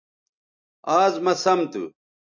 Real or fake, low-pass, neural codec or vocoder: real; 7.2 kHz; none